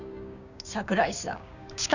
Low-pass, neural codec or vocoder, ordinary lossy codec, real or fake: 7.2 kHz; codec, 16 kHz, 6 kbps, DAC; none; fake